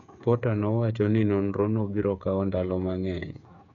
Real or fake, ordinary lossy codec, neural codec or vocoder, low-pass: fake; none; codec, 16 kHz, 8 kbps, FreqCodec, smaller model; 7.2 kHz